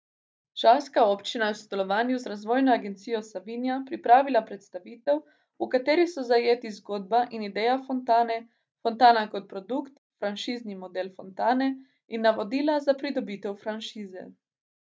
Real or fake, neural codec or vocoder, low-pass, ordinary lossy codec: real; none; none; none